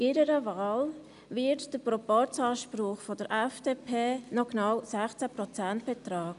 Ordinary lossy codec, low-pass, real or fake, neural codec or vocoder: none; 10.8 kHz; real; none